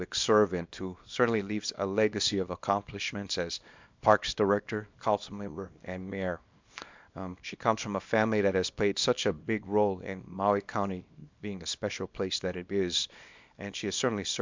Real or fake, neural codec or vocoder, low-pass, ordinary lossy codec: fake; codec, 24 kHz, 0.9 kbps, WavTokenizer, small release; 7.2 kHz; MP3, 64 kbps